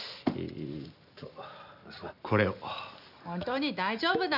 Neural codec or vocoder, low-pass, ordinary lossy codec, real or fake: none; 5.4 kHz; none; real